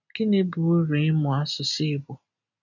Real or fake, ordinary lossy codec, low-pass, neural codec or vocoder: real; none; 7.2 kHz; none